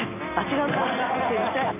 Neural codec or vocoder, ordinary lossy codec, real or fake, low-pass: none; none; real; 3.6 kHz